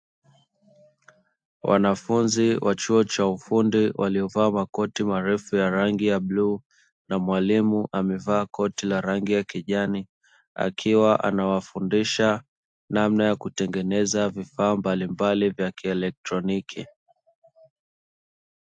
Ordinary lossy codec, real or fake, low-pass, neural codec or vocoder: AAC, 64 kbps; real; 9.9 kHz; none